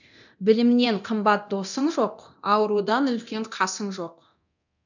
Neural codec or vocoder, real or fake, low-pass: codec, 24 kHz, 0.9 kbps, DualCodec; fake; 7.2 kHz